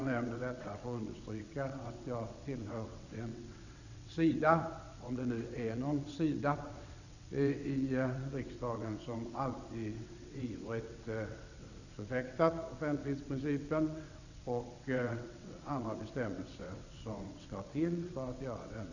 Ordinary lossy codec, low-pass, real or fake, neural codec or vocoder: none; 7.2 kHz; fake; vocoder, 22.05 kHz, 80 mel bands, WaveNeXt